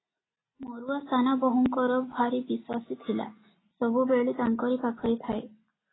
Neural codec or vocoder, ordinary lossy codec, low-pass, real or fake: none; AAC, 16 kbps; 7.2 kHz; real